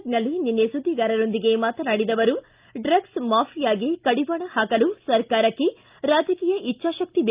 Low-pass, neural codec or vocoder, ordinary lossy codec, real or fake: 3.6 kHz; none; Opus, 24 kbps; real